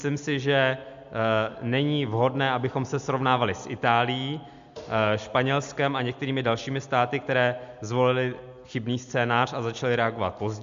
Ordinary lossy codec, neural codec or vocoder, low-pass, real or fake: MP3, 64 kbps; none; 7.2 kHz; real